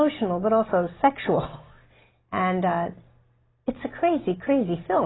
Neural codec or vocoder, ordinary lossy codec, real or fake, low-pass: none; AAC, 16 kbps; real; 7.2 kHz